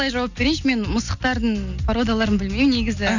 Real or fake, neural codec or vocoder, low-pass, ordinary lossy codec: real; none; 7.2 kHz; none